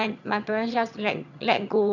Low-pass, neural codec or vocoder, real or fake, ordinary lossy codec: 7.2 kHz; vocoder, 22.05 kHz, 80 mel bands, HiFi-GAN; fake; none